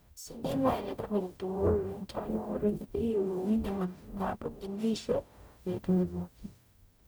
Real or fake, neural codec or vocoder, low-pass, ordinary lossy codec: fake; codec, 44.1 kHz, 0.9 kbps, DAC; none; none